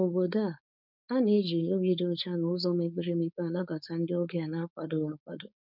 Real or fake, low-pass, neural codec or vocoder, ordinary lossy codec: fake; 5.4 kHz; codec, 16 kHz, 4.8 kbps, FACodec; none